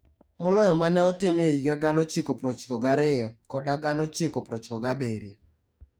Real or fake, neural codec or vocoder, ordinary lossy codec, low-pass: fake; codec, 44.1 kHz, 2.6 kbps, DAC; none; none